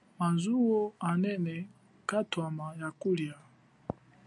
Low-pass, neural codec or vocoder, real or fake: 10.8 kHz; none; real